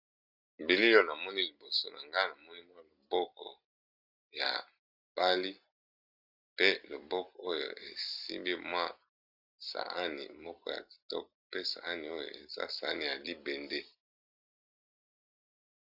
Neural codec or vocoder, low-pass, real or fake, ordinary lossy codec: none; 5.4 kHz; real; AAC, 48 kbps